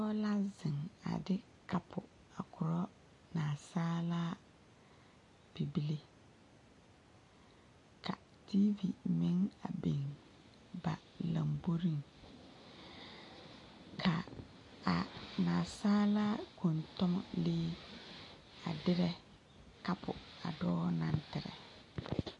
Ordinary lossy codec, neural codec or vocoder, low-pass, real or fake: MP3, 48 kbps; none; 10.8 kHz; real